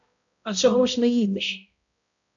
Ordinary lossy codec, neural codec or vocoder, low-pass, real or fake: MP3, 96 kbps; codec, 16 kHz, 0.5 kbps, X-Codec, HuBERT features, trained on balanced general audio; 7.2 kHz; fake